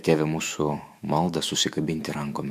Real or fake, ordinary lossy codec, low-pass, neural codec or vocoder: fake; MP3, 96 kbps; 14.4 kHz; autoencoder, 48 kHz, 128 numbers a frame, DAC-VAE, trained on Japanese speech